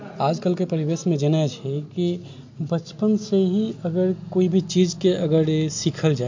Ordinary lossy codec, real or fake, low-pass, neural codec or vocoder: MP3, 48 kbps; real; 7.2 kHz; none